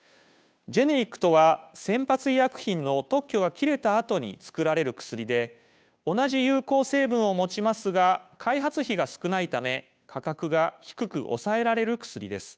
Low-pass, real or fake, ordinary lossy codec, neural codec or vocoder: none; fake; none; codec, 16 kHz, 2 kbps, FunCodec, trained on Chinese and English, 25 frames a second